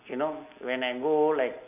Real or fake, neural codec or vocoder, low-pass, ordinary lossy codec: real; none; 3.6 kHz; none